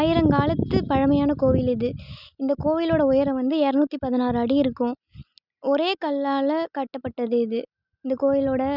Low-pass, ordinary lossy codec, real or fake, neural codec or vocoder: 5.4 kHz; none; real; none